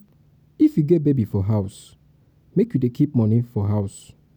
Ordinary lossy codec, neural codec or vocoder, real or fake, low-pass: none; none; real; 19.8 kHz